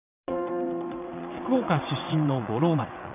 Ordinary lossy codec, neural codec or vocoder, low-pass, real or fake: none; vocoder, 22.05 kHz, 80 mel bands, WaveNeXt; 3.6 kHz; fake